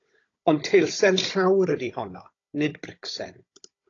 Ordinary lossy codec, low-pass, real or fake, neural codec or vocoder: AAC, 32 kbps; 7.2 kHz; fake; codec, 16 kHz, 16 kbps, FunCodec, trained on Chinese and English, 50 frames a second